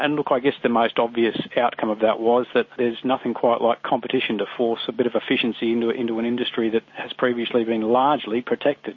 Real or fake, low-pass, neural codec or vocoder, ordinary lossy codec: real; 7.2 kHz; none; MP3, 32 kbps